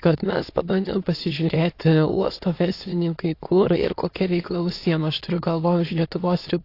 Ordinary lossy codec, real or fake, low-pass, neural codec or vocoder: AAC, 32 kbps; fake; 5.4 kHz; autoencoder, 22.05 kHz, a latent of 192 numbers a frame, VITS, trained on many speakers